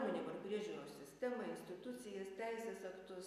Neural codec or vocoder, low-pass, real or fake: none; 14.4 kHz; real